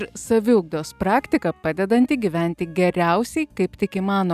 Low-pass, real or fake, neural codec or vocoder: 14.4 kHz; real; none